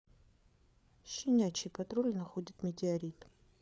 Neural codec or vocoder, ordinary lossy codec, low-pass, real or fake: codec, 16 kHz, 8 kbps, FreqCodec, larger model; none; none; fake